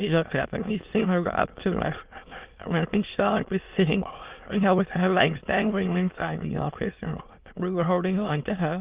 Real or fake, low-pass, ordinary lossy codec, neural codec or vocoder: fake; 3.6 kHz; Opus, 24 kbps; autoencoder, 22.05 kHz, a latent of 192 numbers a frame, VITS, trained on many speakers